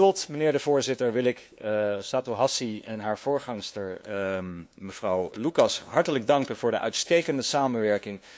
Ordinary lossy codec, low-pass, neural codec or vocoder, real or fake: none; none; codec, 16 kHz, 2 kbps, FunCodec, trained on LibriTTS, 25 frames a second; fake